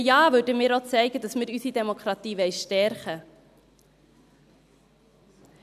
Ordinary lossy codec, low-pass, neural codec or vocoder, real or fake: none; 14.4 kHz; none; real